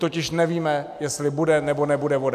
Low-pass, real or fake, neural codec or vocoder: 14.4 kHz; real; none